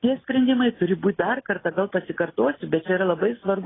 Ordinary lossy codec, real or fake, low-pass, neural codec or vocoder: AAC, 16 kbps; real; 7.2 kHz; none